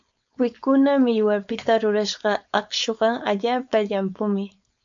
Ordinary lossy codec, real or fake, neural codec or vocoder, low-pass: MP3, 64 kbps; fake; codec, 16 kHz, 4.8 kbps, FACodec; 7.2 kHz